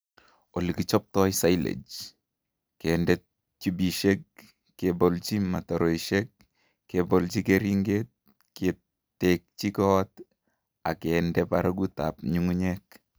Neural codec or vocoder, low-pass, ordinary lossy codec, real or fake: none; none; none; real